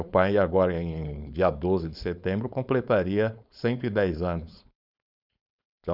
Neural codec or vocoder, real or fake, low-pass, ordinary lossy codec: codec, 16 kHz, 4.8 kbps, FACodec; fake; 5.4 kHz; none